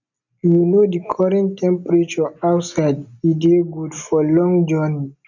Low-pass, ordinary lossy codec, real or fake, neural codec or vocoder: 7.2 kHz; none; real; none